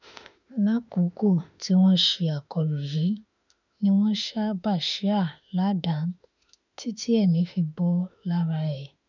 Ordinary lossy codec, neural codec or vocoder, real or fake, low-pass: none; autoencoder, 48 kHz, 32 numbers a frame, DAC-VAE, trained on Japanese speech; fake; 7.2 kHz